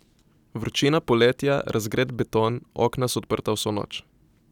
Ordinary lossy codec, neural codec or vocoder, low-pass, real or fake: none; none; 19.8 kHz; real